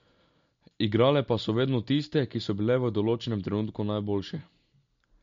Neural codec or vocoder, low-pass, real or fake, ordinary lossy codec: none; 7.2 kHz; real; MP3, 48 kbps